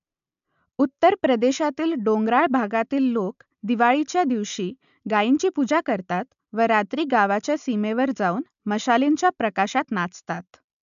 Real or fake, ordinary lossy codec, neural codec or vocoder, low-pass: real; AAC, 96 kbps; none; 7.2 kHz